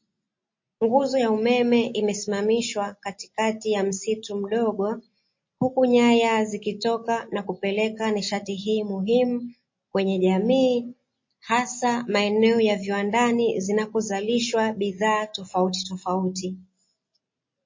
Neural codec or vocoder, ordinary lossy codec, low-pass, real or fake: none; MP3, 32 kbps; 7.2 kHz; real